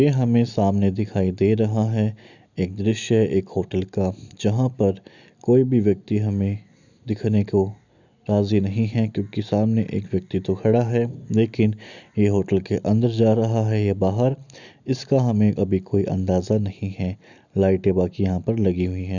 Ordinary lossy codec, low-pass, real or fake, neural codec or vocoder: none; 7.2 kHz; real; none